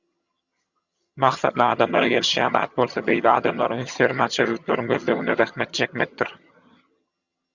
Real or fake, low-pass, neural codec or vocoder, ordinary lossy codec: fake; 7.2 kHz; vocoder, 22.05 kHz, 80 mel bands, HiFi-GAN; Opus, 64 kbps